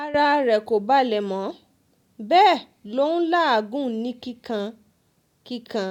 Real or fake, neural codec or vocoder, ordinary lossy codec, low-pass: real; none; none; 19.8 kHz